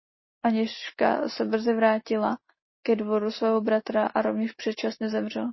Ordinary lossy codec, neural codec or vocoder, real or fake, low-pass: MP3, 24 kbps; none; real; 7.2 kHz